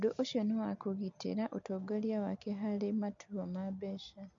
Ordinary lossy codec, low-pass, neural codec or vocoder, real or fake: MP3, 64 kbps; 7.2 kHz; none; real